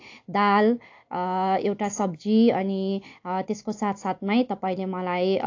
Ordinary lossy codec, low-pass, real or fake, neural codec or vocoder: AAC, 48 kbps; 7.2 kHz; real; none